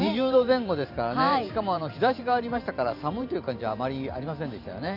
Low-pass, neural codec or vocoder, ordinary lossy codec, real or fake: 5.4 kHz; vocoder, 44.1 kHz, 128 mel bands every 512 samples, BigVGAN v2; AAC, 48 kbps; fake